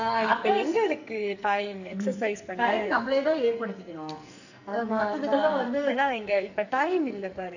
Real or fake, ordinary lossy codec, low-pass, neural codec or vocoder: fake; none; 7.2 kHz; codec, 44.1 kHz, 2.6 kbps, SNAC